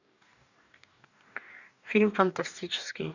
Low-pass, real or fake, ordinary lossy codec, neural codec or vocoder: 7.2 kHz; fake; none; codec, 44.1 kHz, 2.6 kbps, DAC